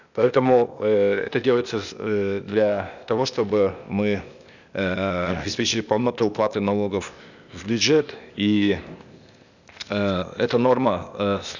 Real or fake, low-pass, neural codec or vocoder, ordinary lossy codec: fake; 7.2 kHz; codec, 16 kHz, 0.8 kbps, ZipCodec; none